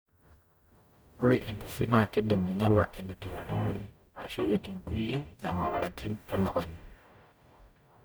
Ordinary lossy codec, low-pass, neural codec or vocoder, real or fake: none; none; codec, 44.1 kHz, 0.9 kbps, DAC; fake